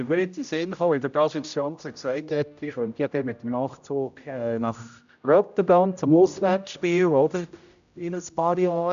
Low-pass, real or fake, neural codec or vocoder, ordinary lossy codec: 7.2 kHz; fake; codec, 16 kHz, 0.5 kbps, X-Codec, HuBERT features, trained on general audio; AAC, 96 kbps